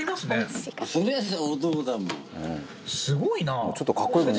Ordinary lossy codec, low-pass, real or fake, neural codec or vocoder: none; none; real; none